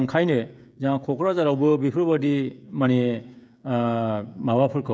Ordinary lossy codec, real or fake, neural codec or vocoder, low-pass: none; fake; codec, 16 kHz, 16 kbps, FreqCodec, smaller model; none